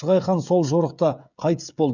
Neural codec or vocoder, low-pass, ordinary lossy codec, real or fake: codec, 16 kHz, 16 kbps, FreqCodec, smaller model; 7.2 kHz; none; fake